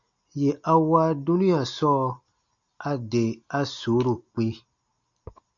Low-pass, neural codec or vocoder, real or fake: 7.2 kHz; none; real